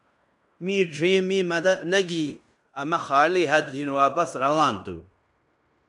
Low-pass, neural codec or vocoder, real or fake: 10.8 kHz; codec, 16 kHz in and 24 kHz out, 0.9 kbps, LongCat-Audio-Codec, fine tuned four codebook decoder; fake